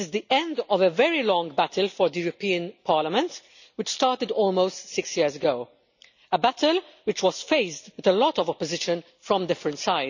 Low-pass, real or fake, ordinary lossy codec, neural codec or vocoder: 7.2 kHz; real; none; none